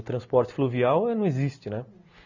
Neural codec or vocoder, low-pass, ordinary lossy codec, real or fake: none; 7.2 kHz; none; real